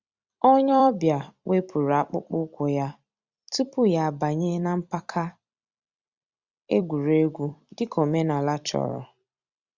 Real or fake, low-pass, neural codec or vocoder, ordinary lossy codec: fake; 7.2 kHz; vocoder, 44.1 kHz, 128 mel bands every 256 samples, BigVGAN v2; Opus, 64 kbps